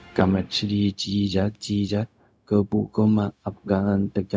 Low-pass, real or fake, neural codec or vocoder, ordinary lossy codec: none; fake; codec, 16 kHz, 0.4 kbps, LongCat-Audio-Codec; none